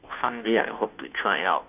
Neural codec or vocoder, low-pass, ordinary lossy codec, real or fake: codec, 16 kHz, 0.5 kbps, FunCodec, trained on Chinese and English, 25 frames a second; 3.6 kHz; none; fake